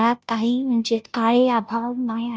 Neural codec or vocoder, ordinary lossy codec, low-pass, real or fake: codec, 16 kHz, 0.5 kbps, FunCodec, trained on Chinese and English, 25 frames a second; none; none; fake